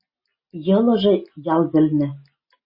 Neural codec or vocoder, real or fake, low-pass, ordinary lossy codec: none; real; 5.4 kHz; MP3, 24 kbps